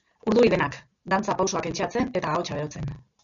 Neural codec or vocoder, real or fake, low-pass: none; real; 7.2 kHz